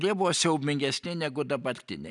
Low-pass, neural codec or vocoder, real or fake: 10.8 kHz; none; real